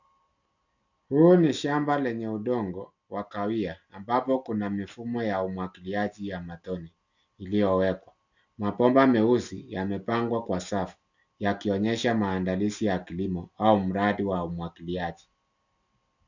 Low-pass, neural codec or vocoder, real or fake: 7.2 kHz; none; real